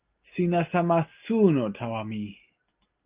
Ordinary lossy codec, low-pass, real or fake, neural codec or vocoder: Opus, 32 kbps; 3.6 kHz; real; none